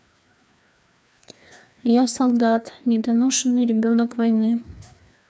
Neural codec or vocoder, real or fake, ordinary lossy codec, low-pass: codec, 16 kHz, 2 kbps, FreqCodec, larger model; fake; none; none